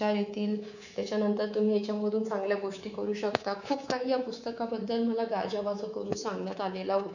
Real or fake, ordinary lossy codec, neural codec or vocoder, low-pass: fake; none; codec, 24 kHz, 3.1 kbps, DualCodec; 7.2 kHz